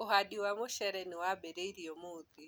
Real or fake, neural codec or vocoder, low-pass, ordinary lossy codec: real; none; none; none